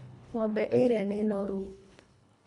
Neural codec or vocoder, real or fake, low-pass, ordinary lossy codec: codec, 24 kHz, 1.5 kbps, HILCodec; fake; 10.8 kHz; none